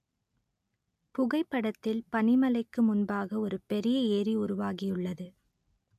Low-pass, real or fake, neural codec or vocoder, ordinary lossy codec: 14.4 kHz; real; none; AAC, 96 kbps